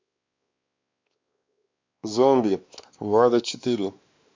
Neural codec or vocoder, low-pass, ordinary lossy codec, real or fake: codec, 16 kHz, 2 kbps, X-Codec, WavLM features, trained on Multilingual LibriSpeech; 7.2 kHz; MP3, 64 kbps; fake